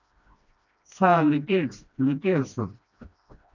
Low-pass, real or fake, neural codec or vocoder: 7.2 kHz; fake; codec, 16 kHz, 1 kbps, FreqCodec, smaller model